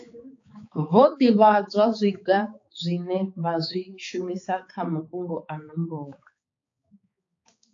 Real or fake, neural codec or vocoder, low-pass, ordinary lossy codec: fake; codec, 16 kHz, 4 kbps, X-Codec, HuBERT features, trained on balanced general audio; 7.2 kHz; AAC, 48 kbps